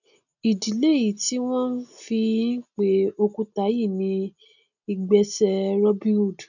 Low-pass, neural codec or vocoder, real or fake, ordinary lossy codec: 7.2 kHz; none; real; none